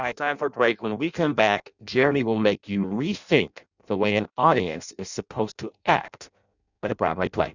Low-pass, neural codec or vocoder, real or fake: 7.2 kHz; codec, 16 kHz in and 24 kHz out, 0.6 kbps, FireRedTTS-2 codec; fake